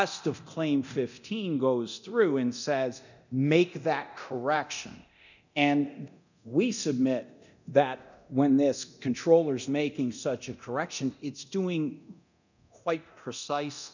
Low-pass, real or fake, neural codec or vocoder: 7.2 kHz; fake; codec, 24 kHz, 0.9 kbps, DualCodec